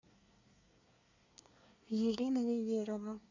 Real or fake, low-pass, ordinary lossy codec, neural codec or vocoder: fake; 7.2 kHz; AAC, 48 kbps; codec, 24 kHz, 1 kbps, SNAC